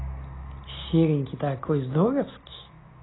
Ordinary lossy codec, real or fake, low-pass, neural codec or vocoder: AAC, 16 kbps; real; 7.2 kHz; none